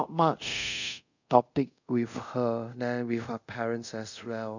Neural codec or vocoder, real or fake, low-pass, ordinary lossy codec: codec, 24 kHz, 0.5 kbps, DualCodec; fake; 7.2 kHz; none